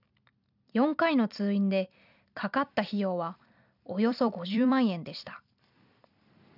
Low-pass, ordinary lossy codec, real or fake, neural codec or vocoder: 5.4 kHz; none; fake; vocoder, 44.1 kHz, 128 mel bands every 256 samples, BigVGAN v2